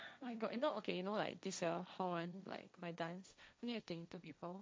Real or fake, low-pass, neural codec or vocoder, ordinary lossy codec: fake; none; codec, 16 kHz, 1.1 kbps, Voila-Tokenizer; none